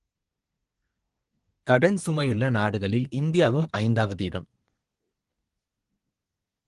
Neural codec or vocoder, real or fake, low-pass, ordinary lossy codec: codec, 24 kHz, 1 kbps, SNAC; fake; 10.8 kHz; Opus, 24 kbps